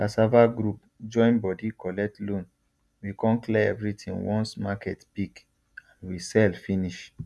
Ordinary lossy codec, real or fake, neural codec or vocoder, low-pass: none; real; none; none